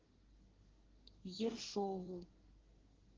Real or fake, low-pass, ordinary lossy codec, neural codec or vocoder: fake; 7.2 kHz; Opus, 16 kbps; codec, 24 kHz, 1 kbps, SNAC